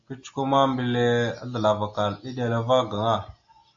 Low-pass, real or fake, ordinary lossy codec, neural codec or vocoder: 7.2 kHz; real; AAC, 48 kbps; none